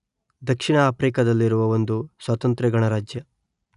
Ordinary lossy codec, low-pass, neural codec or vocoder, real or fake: none; 10.8 kHz; none; real